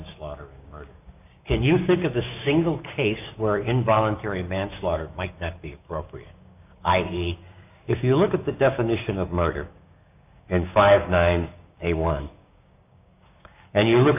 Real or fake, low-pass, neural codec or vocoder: fake; 3.6 kHz; codec, 16 kHz, 6 kbps, DAC